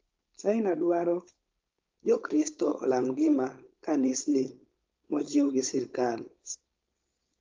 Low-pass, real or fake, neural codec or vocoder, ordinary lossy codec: 7.2 kHz; fake; codec, 16 kHz, 4.8 kbps, FACodec; Opus, 32 kbps